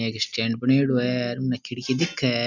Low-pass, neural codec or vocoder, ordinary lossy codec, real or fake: 7.2 kHz; none; none; real